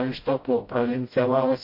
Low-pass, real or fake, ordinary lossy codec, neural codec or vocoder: 5.4 kHz; fake; MP3, 32 kbps; codec, 16 kHz, 0.5 kbps, FreqCodec, smaller model